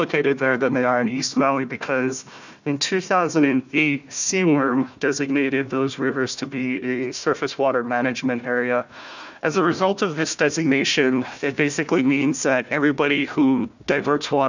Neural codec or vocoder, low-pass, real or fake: codec, 16 kHz, 1 kbps, FunCodec, trained on Chinese and English, 50 frames a second; 7.2 kHz; fake